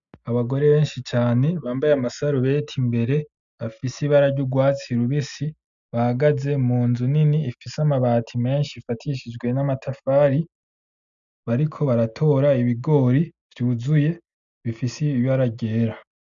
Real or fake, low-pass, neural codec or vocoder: real; 7.2 kHz; none